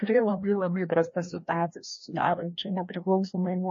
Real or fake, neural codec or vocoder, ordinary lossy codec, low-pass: fake; codec, 16 kHz, 1 kbps, FreqCodec, larger model; MP3, 32 kbps; 7.2 kHz